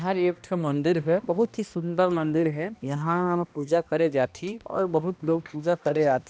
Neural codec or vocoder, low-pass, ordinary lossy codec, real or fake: codec, 16 kHz, 1 kbps, X-Codec, HuBERT features, trained on balanced general audio; none; none; fake